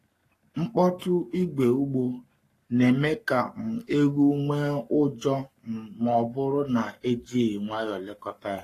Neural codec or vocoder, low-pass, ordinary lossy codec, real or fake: codec, 44.1 kHz, 7.8 kbps, Pupu-Codec; 14.4 kHz; AAC, 48 kbps; fake